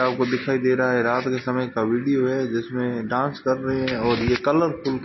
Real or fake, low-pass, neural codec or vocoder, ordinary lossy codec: real; 7.2 kHz; none; MP3, 24 kbps